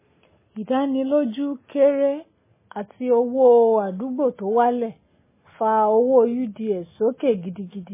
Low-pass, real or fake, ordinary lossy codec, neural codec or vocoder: 3.6 kHz; real; MP3, 16 kbps; none